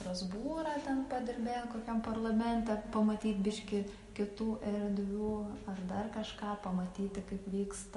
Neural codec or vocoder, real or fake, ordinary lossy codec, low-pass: none; real; MP3, 48 kbps; 14.4 kHz